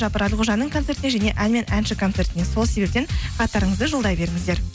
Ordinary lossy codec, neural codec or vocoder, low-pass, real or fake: none; none; none; real